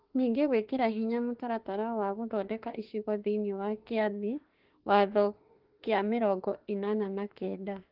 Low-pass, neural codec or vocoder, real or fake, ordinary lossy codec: 5.4 kHz; autoencoder, 48 kHz, 32 numbers a frame, DAC-VAE, trained on Japanese speech; fake; Opus, 16 kbps